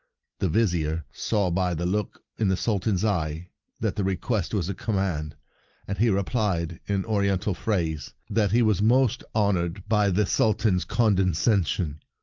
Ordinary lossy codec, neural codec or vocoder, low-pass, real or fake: Opus, 24 kbps; none; 7.2 kHz; real